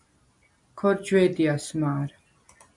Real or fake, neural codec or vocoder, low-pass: real; none; 10.8 kHz